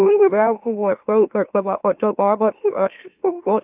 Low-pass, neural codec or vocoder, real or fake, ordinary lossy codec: 3.6 kHz; autoencoder, 44.1 kHz, a latent of 192 numbers a frame, MeloTTS; fake; AAC, 32 kbps